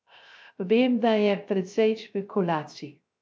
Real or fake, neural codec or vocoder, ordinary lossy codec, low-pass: fake; codec, 16 kHz, 0.3 kbps, FocalCodec; none; none